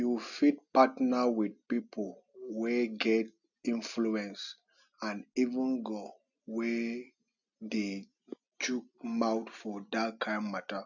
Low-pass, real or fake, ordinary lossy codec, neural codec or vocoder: 7.2 kHz; real; none; none